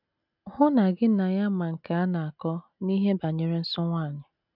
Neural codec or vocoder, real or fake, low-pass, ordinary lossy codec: none; real; 5.4 kHz; none